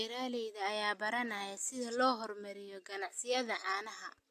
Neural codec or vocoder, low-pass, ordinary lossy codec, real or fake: none; 14.4 kHz; AAC, 64 kbps; real